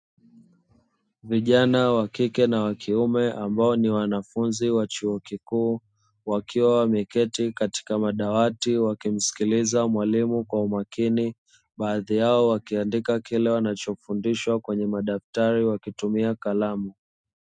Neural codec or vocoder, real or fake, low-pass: none; real; 9.9 kHz